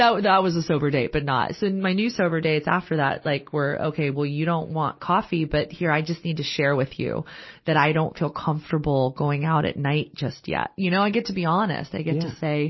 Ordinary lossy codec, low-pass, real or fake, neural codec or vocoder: MP3, 24 kbps; 7.2 kHz; real; none